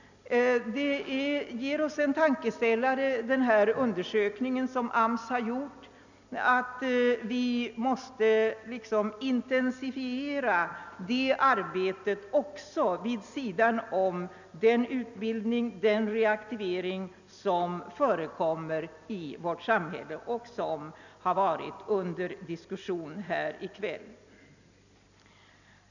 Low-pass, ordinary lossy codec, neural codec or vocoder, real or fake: 7.2 kHz; none; none; real